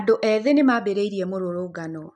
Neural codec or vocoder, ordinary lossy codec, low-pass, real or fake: none; none; 10.8 kHz; real